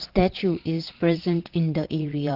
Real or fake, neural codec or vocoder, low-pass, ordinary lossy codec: fake; vocoder, 22.05 kHz, 80 mel bands, Vocos; 5.4 kHz; Opus, 16 kbps